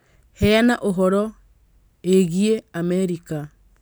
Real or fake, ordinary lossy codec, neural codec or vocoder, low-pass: real; none; none; none